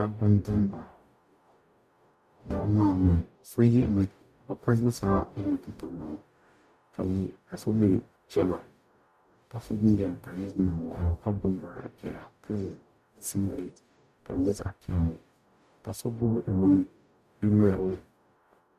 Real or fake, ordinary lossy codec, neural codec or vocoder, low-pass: fake; MP3, 96 kbps; codec, 44.1 kHz, 0.9 kbps, DAC; 14.4 kHz